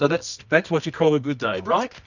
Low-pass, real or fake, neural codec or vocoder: 7.2 kHz; fake; codec, 24 kHz, 0.9 kbps, WavTokenizer, medium music audio release